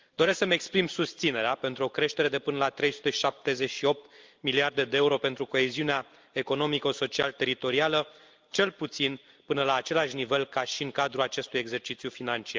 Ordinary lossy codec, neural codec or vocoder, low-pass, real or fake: Opus, 32 kbps; none; 7.2 kHz; real